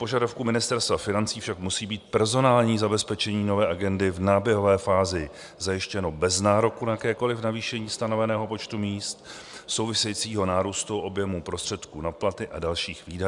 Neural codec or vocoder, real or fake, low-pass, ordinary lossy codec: none; real; 10.8 kHz; MP3, 96 kbps